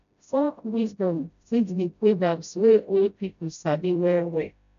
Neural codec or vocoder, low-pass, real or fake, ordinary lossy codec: codec, 16 kHz, 0.5 kbps, FreqCodec, smaller model; 7.2 kHz; fake; none